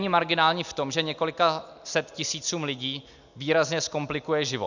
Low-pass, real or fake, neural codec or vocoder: 7.2 kHz; real; none